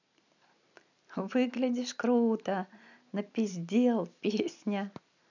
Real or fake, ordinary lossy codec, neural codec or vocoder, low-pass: real; none; none; 7.2 kHz